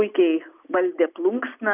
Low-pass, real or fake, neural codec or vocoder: 3.6 kHz; real; none